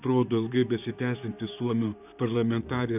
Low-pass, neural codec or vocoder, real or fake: 3.6 kHz; vocoder, 44.1 kHz, 128 mel bands, Pupu-Vocoder; fake